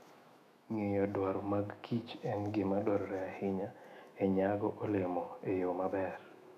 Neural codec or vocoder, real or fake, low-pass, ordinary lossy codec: autoencoder, 48 kHz, 128 numbers a frame, DAC-VAE, trained on Japanese speech; fake; 14.4 kHz; none